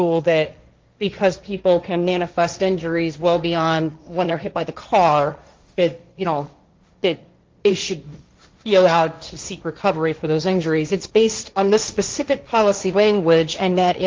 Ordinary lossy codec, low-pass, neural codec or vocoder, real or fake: Opus, 32 kbps; 7.2 kHz; codec, 16 kHz, 1.1 kbps, Voila-Tokenizer; fake